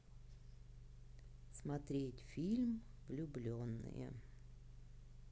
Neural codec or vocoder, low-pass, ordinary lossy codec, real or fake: none; none; none; real